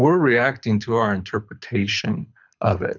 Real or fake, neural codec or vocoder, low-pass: fake; codec, 24 kHz, 6 kbps, HILCodec; 7.2 kHz